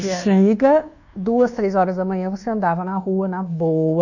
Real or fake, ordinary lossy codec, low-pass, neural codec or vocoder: fake; none; 7.2 kHz; codec, 24 kHz, 1.2 kbps, DualCodec